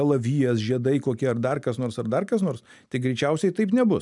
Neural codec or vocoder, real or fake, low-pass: none; real; 10.8 kHz